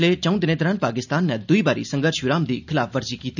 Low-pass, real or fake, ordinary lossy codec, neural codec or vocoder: 7.2 kHz; real; none; none